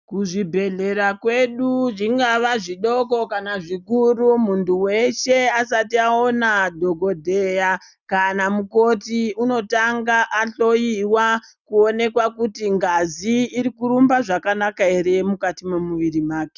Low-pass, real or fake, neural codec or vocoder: 7.2 kHz; real; none